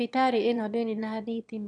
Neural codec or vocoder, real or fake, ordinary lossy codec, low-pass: autoencoder, 22.05 kHz, a latent of 192 numbers a frame, VITS, trained on one speaker; fake; none; 9.9 kHz